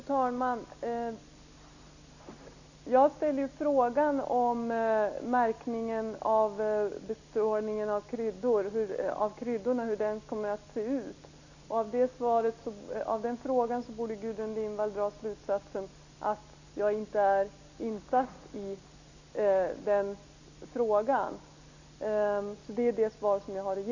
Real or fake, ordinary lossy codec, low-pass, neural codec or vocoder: real; none; 7.2 kHz; none